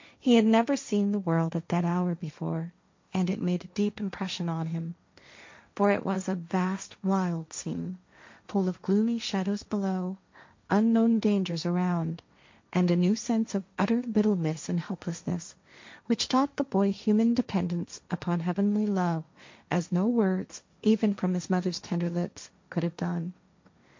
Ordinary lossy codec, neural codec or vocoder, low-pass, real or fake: MP3, 48 kbps; codec, 16 kHz, 1.1 kbps, Voila-Tokenizer; 7.2 kHz; fake